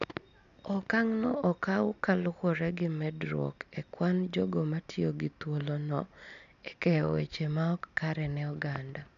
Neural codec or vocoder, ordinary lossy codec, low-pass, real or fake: none; none; 7.2 kHz; real